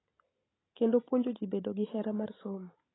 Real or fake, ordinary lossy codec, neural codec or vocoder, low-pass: real; AAC, 16 kbps; none; 7.2 kHz